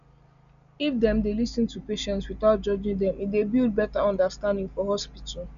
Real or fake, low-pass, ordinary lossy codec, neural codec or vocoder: real; 7.2 kHz; none; none